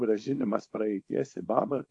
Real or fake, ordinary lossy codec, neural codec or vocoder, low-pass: fake; AAC, 48 kbps; codec, 24 kHz, 0.9 kbps, WavTokenizer, small release; 10.8 kHz